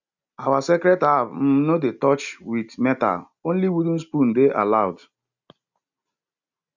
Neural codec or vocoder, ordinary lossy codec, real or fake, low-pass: none; none; real; 7.2 kHz